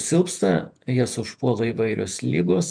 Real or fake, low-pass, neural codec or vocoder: fake; 9.9 kHz; vocoder, 48 kHz, 128 mel bands, Vocos